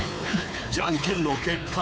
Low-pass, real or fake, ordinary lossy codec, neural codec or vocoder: none; fake; none; codec, 16 kHz, 4 kbps, X-Codec, WavLM features, trained on Multilingual LibriSpeech